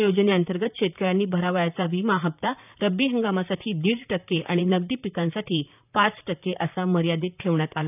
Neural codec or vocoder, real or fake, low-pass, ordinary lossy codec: vocoder, 44.1 kHz, 128 mel bands, Pupu-Vocoder; fake; 3.6 kHz; none